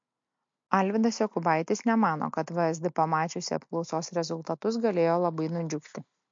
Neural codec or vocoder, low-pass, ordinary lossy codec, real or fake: none; 7.2 kHz; MP3, 48 kbps; real